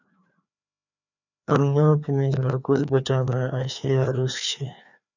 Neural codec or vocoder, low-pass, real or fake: codec, 16 kHz, 2 kbps, FreqCodec, larger model; 7.2 kHz; fake